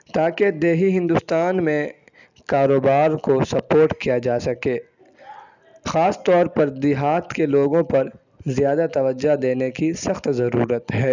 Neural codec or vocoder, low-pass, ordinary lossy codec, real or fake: none; 7.2 kHz; none; real